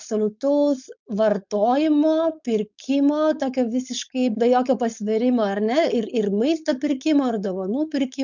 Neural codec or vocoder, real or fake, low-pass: codec, 16 kHz, 4.8 kbps, FACodec; fake; 7.2 kHz